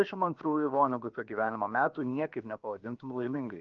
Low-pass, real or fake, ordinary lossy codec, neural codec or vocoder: 7.2 kHz; fake; Opus, 24 kbps; codec, 16 kHz, about 1 kbps, DyCAST, with the encoder's durations